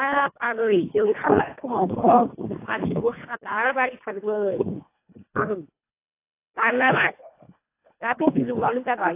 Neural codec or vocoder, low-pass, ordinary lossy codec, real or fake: codec, 24 kHz, 1.5 kbps, HILCodec; 3.6 kHz; AAC, 24 kbps; fake